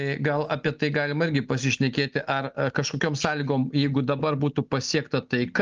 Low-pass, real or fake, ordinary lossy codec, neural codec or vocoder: 7.2 kHz; real; Opus, 24 kbps; none